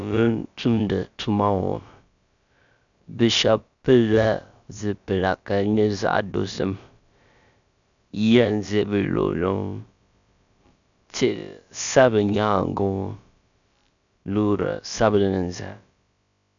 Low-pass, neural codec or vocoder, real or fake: 7.2 kHz; codec, 16 kHz, about 1 kbps, DyCAST, with the encoder's durations; fake